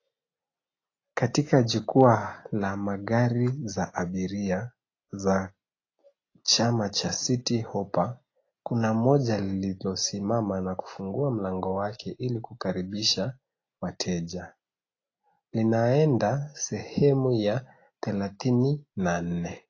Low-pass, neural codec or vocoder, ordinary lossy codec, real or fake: 7.2 kHz; none; AAC, 32 kbps; real